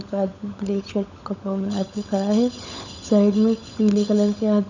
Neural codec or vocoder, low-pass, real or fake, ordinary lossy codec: codec, 16 kHz, 16 kbps, FunCodec, trained on LibriTTS, 50 frames a second; 7.2 kHz; fake; none